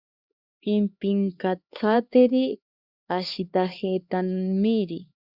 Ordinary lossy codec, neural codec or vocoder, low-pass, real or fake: Opus, 64 kbps; codec, 16 kHz, 2 kbps, X-Codec, HuBERT features, trained on LibriSpeech; 5.4 kHz; fake